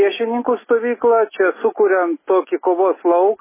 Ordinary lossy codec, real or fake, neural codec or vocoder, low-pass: MP3, 16 kbps; real; none; 3.6 kHz